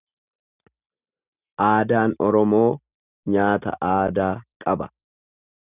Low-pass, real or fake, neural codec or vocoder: 3.6 kHz; real; none